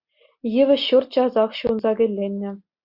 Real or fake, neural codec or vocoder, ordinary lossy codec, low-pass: real; none; Opus, 64 kbps; 5.4 kHz